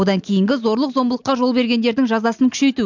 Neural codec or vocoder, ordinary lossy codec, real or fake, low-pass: none; MP3, 64 kbps; real; 7.2 kHz